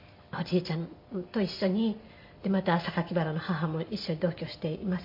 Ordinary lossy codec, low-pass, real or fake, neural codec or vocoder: MP3, 32 kbps; 5.4 kHz; real; none